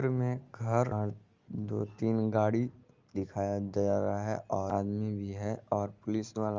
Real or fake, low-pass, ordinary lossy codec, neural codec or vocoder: real; 7.2 kHz; Opus, 32 kbps; none